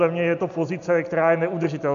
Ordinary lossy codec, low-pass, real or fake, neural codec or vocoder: MP3, 64 kbps; 7.2 kHz; real; none